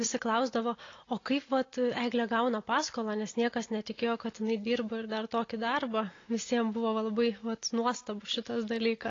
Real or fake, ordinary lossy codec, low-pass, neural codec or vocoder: real; AAC, 32 kbps; 7.2 kHz; none